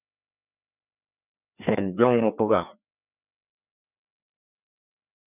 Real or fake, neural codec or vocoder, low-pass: fake; codec, 16 kHz, 2 kbps, FreqCodec, larger model; 3.6 kHz